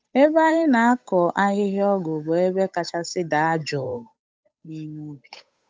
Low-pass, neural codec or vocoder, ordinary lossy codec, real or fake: none; codec, 16 kHz, 8 kbps, FunCodec, trained on Chinese and English, 25 frames a second; none; fake